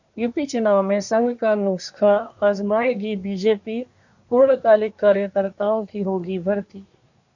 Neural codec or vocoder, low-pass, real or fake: codec, 24 kHz, 1 kbps, SNAC; 7.2 kHz; fake